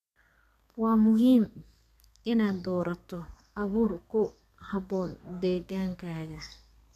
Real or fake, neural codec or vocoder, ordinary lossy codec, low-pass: fake; codec, 32 kHz, 1.9 kbps, SNAC; none; 14.4 kHz